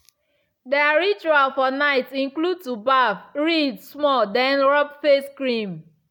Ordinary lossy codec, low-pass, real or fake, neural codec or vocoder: none; 19.8 kHz; real; none